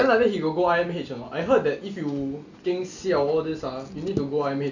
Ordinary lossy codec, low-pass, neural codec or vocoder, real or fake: none; 7.2 kHz; none; real